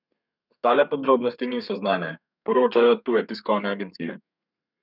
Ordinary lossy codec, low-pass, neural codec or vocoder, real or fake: none; 5.4 kHz; codec, 32 kHz, 1.9 kbps, SNAC; fake